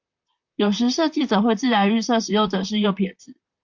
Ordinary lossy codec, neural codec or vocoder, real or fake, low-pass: MP3, 48 kbps; vocoder, 44.1 kHz, 128 mel bands, Pupu-Vocoder; fake; 7.2 kHz